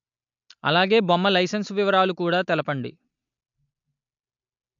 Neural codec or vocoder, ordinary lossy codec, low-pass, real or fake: none; MP3, 64 kbps; 7.2 kHz; real